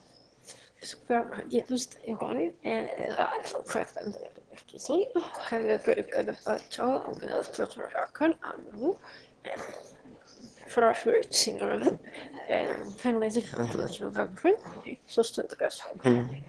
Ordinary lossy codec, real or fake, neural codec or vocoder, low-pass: Opus, 16 kbps; fake; autoencoder, 22.05 kHz, a latent of 192 numbers a frame, VITS, trained on one speaker; 9.9 kHz